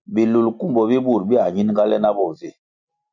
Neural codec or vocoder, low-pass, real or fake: none; 7.2 kHz; real